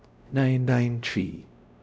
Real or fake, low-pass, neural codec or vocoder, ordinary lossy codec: fake; none; codec, 16 kHz, 0.5 kbps, X-Codec, WavLM features, trained on Multilingual LibriSpeech; none